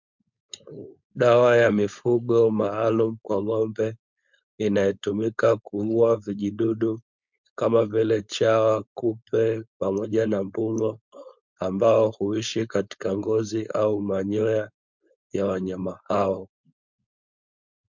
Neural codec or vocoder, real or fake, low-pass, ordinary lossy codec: codec, 16 kHz, 4.8 kbps, FACodec; fake; 7.2 kHz; MP3, 64 kbps